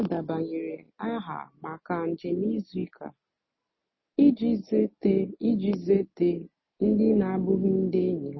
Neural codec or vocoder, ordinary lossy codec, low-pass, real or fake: none; MP3, 24 kbps; 7.2 kHz; real